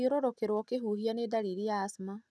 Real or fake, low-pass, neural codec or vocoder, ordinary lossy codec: real; none; none; none